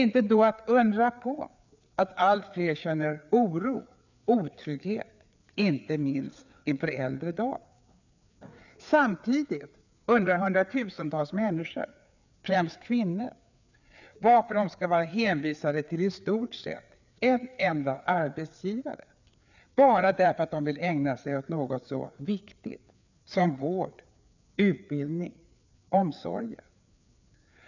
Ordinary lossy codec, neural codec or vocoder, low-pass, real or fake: none; codec, 16 kHz, 4 kbps, FreqCodec, larger model; 7.2 kHz; fake